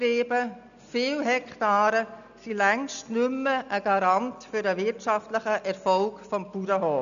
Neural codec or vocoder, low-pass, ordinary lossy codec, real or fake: none; 7.2 kHz; none; real